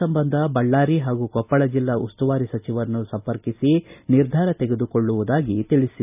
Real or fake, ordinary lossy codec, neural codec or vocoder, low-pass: real; none; none; 3.6 kHz